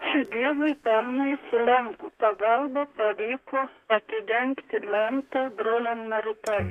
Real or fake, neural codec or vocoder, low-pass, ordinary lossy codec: fake; codec, 32 kHz, 1.9 kbps, SNAC; 14.4 kHz; Opus, 64 kbps